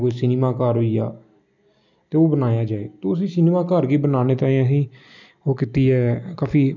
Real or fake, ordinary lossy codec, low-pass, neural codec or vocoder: real; none; 7.2 kHz; none